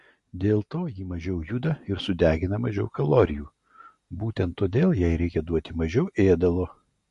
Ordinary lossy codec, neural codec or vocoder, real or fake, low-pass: MP3, 48 kbps; none; real; 14.4 kHz